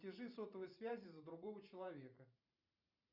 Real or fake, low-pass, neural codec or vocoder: fake; 5.4 kHz; vocoder, 44.1 kHz, 128 mel bands every 512 samples, BigVGAN v2